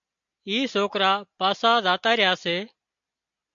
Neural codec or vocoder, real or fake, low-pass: none; real; 7.2 kHz